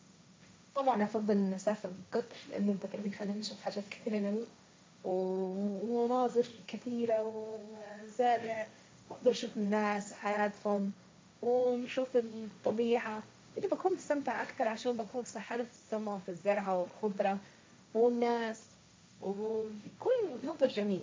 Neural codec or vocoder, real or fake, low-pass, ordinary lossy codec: codec, 16 kHz, 1.1 kbps, Voila-Tokenizer; fake; none; none